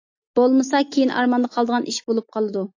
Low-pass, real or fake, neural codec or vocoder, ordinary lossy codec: 7.2 kHz; real; none; AAC, 48 kbps